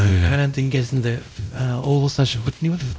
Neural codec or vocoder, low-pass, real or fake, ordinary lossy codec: codec, 16 kHz, 0.5 kbps, X-Codec, WavLM features, trained on Multilingual LibriSpeech; none; fake; none